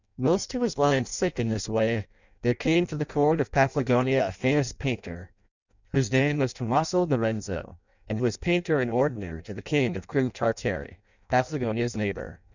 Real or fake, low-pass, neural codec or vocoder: fake; 7.2 kHz; codec, 16 kHz in and 24 kHz out, 0.6 kbps, FireRedTTS-2 codec